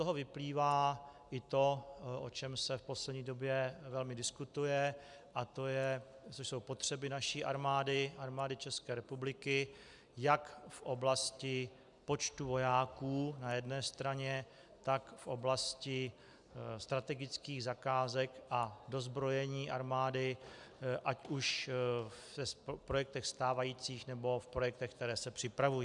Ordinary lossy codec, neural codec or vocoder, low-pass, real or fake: MP3, 96 kbps; none; 10.8 kHz; real